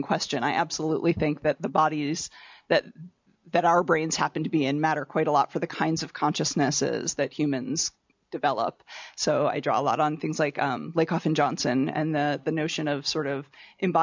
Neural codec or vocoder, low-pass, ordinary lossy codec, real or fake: none; 7.2 kHz; MP3, 64 kbps; real